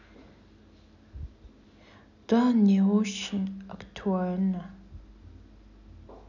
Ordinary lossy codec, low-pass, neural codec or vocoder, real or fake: none; 7.2 kHz; none; real